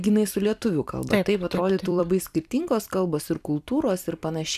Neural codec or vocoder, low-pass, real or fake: vocoder, 44.1 kHz, 128 mel bands every 256 samples, BigVGAN v2; 14.4 kHz; fake